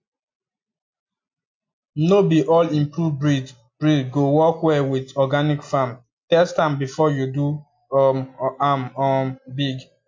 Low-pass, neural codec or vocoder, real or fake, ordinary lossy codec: 7.2 kHz; none; real; MP3, 48 kbps